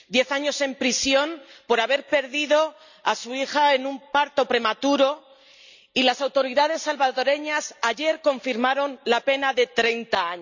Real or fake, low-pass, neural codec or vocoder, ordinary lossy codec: real; 7.2 kHz; none; none